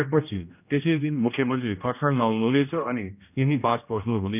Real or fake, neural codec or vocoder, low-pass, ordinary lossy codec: fake; codec, 16 kHz, 1 kbps, X-Codec, HuBERT features, trained on general audio; 3.6 kHz; none